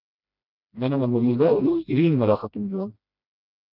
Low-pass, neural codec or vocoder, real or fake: 5.4 kHz; codec, 16 kHz, 1 kbps, FreqCodec, smaller model; fake